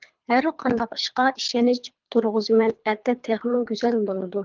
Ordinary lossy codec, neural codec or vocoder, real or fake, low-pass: Opus, 32 kbps; codec, 24 kHz, 3 kbps, HILCodec; fake; 7.2 kHz